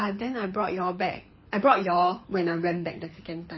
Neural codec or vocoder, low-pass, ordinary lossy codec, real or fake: codec, 24 kHz, 6 kbps, HILCodec; 7.2 kHz; MP3, 24 kbps; fake